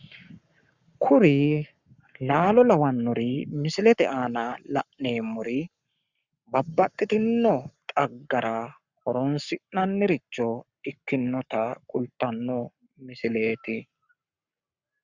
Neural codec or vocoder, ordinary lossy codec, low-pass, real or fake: codec, 44.1 kHz, 7.8 kbps, Pupu-Codec; Opus, 64 kbps; 7.2 kHz; fake